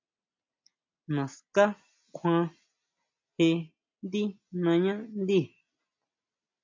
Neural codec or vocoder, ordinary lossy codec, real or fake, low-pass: none; MP3, 48 kbps; real; 7.2 kHz